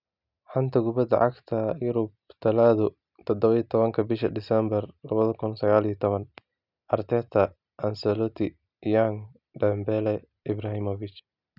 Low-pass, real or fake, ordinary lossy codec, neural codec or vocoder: 5.4 kHz; real; none; none